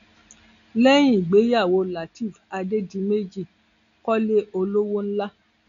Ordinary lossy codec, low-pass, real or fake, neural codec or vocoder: none; 7.2 kHz; real; none